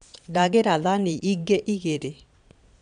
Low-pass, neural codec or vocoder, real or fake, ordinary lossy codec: 9.9 kHz; vocoder, 22.05 kHz, 80 mel bands, Vocos; fake; none